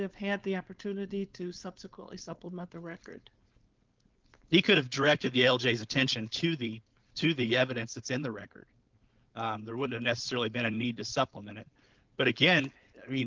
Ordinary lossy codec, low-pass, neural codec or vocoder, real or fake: Opus, 24 kbps; 7.2 kHz; codec, 16 kHz, 4.8 kbps, FACodec; fake